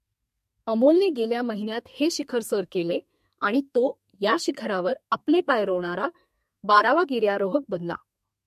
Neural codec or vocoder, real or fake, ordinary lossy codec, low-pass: codec, 32 kHz, 1.9 kbps, SNAC; fake; MP3, 64 kbps; 14.4 kHz